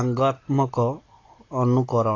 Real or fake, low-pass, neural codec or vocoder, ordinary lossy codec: real; 7.2 kHz; none; AAC, 32 kbps